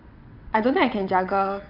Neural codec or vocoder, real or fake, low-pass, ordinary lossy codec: vocoder, 22.05 kHz, 80 mel bands, WaveNeXt; fake; 5.4 kHz; none